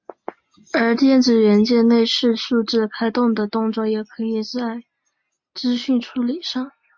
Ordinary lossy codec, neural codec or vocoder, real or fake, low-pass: MP3, 48 kbps; none; real; 7.2 kHz